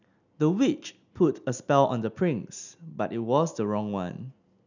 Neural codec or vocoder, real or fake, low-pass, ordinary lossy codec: none; real; 7.2 kHz; none